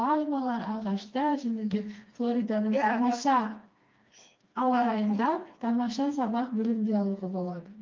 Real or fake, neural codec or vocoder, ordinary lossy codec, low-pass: fake; codec, 16 kHz, 2 kbps, FreqCodec, smaller model; Opus, 24 kbps; 7.2 kHz